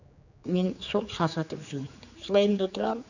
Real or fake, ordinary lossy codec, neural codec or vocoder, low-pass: fake; none; codec, 16 kHz, 4 kbps, X-Codec, HuBERT features, trained on general audio; 7.2 kHz